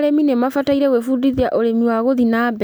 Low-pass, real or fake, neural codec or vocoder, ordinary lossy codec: none; real; none; none